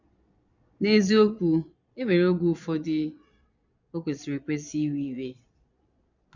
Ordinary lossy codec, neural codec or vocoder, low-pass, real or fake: none; vocoder, 22.05 kHz, 80 mel bands, Vocos; 7.2 kHz; fake